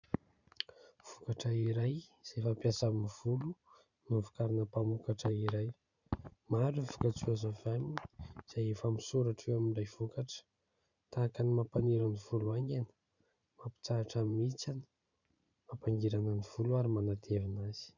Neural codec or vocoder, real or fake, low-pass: vocoder, 44.1 kHz, 128 mel bands every 512 samples, BigVGAN v2; fake; 7.2 kHz